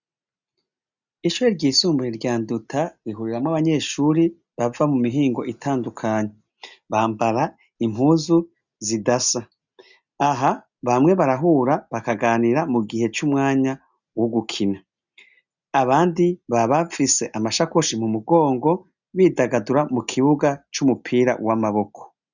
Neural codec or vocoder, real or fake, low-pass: none; real; 7.2 kHz